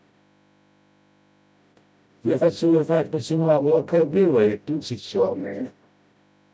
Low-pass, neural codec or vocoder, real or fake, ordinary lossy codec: none; codec, 16 kHz, 0.5 kbps, FreqCodec, smaller model; fake; none